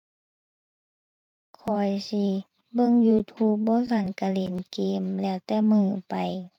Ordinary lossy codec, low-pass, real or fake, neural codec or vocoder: none; 19.8 kHz; fake; vocoder, 44.1 kHz, 128 mel bands every 512 samples, BigVGAN v2